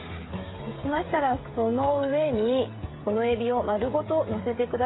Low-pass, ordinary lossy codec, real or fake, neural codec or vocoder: 7.2 kHz; AAC, 16 kbps; fake; codec, 16 kHz, 16 kbps, FreqCodec, smaller model